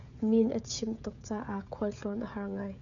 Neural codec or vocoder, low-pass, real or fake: codec, 16 kHz, 16 kbps, FreqCodec, smaller model; 7.2 kHz; fake